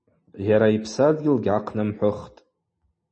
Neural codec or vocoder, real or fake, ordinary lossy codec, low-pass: none; real; MP3, 32 kbps; 9.9 kHz